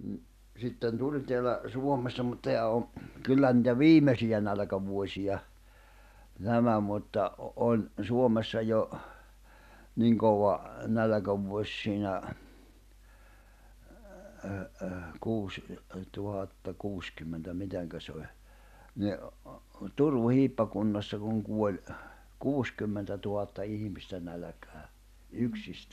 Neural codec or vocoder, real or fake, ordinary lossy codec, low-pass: none; real; none; 14.4 kHz